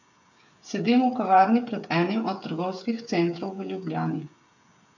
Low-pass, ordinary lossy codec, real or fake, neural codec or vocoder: 7.2 kHz; none; fake; codec, 16 kHz, 8 kbps, FreqCodec, smaller model